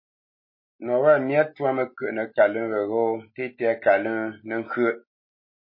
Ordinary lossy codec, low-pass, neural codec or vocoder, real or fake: MP3, 24 kbps; 5.4 kHz; none; real